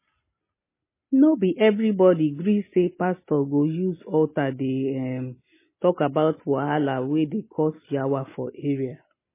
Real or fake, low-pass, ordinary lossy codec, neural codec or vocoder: real; 3.6 kHz; MP3, 16 kbps; none